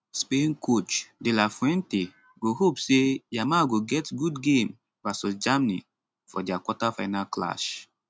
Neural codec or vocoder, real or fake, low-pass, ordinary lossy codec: none; real; none; none